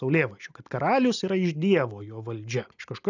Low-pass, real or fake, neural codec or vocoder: 7.2 kHz; real; none